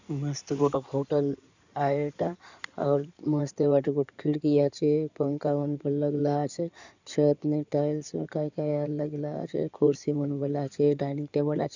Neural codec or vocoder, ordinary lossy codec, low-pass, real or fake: codec, 16 kHz in and 24 kHz out, 2.2 kbps, FireRedTTS-2 codec; none; 7.2 kHz; fake